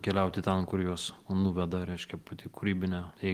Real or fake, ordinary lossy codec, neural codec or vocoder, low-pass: real; Opus, 24 kbps; none; 14.4 kHz